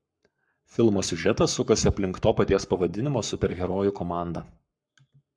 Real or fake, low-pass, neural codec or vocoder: fake; 9.9 kHz; codec, 44.1 kHz, 7.8 kbps, Pupu-Codec